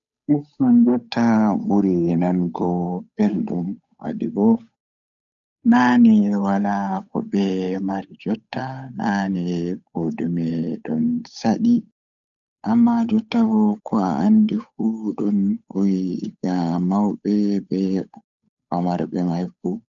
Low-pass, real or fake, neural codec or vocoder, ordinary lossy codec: 7.2 kHz; fake; codec, 16 kHz, 8 kbps, FunCodec, trained on Chinese and English, 25 frames a second; none